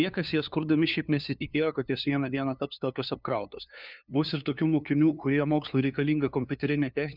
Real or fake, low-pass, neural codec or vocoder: fake; 5.4 kHz; codec, 16 kHz, 2 kbps, FunCodec, trained on LibriTTS, 25 frames a second